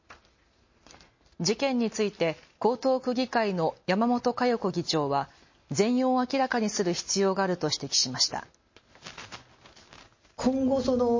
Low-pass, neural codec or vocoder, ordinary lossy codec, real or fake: 7.2 kHz; none; MP3, 32 kbps; real